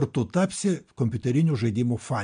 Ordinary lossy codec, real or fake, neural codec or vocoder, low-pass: MP3, 64 kbps; real; none; 9.9 kHz